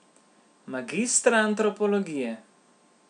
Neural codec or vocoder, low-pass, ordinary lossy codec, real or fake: none; 9.9 kHz; none; real